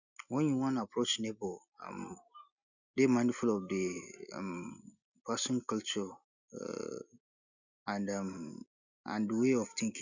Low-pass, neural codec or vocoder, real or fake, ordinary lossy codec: 7.2 kHz; none; real; none